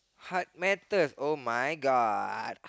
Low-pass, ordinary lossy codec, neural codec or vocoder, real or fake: none; none; none; real